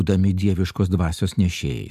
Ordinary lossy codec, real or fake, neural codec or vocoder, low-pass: AAC, 96 kbps; real; none; 14.4 kHz